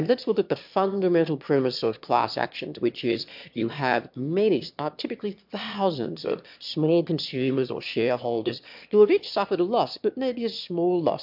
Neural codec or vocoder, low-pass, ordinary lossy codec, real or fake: autoencoder, 22.05 kHz, a latent of 192 numbers a frame, VITS, trained on one speaker; 5.4 kHz; MP3, 48 kbps; fake